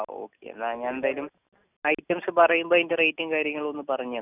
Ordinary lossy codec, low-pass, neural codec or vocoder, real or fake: none; 3.6 kHz; none; real